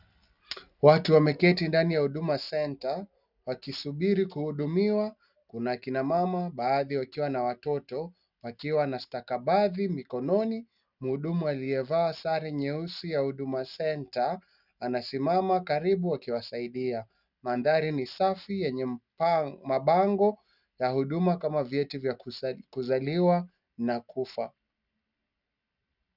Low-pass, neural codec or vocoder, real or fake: 5.4 kHz; none; real